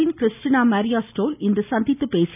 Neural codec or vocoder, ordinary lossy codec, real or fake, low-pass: none; none; real; 3.6 kHz